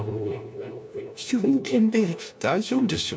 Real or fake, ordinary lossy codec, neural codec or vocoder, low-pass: fake; none; codec, 16 kHz, 1 kbps, FunCodec, trained on LibriTTS, 50 frames a second; none